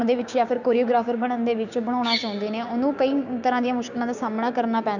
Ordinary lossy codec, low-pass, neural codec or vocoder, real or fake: none; 7.2 kHz; codec, 16 kHz, 6 kbps, DAC; fake